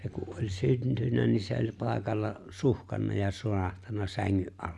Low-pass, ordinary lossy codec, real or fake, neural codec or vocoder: none; none; real; none